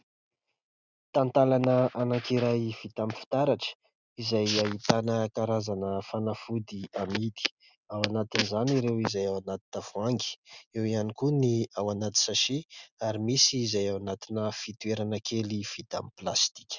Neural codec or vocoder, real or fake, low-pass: none; real; 7.2 kHz